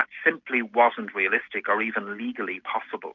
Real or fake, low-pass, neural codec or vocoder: real; 7.2 kHz; none